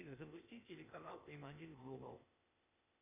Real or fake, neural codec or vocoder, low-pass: fake; codec, 16 kHz, 0.8 kbps, ZipCodec; 3.6 kHz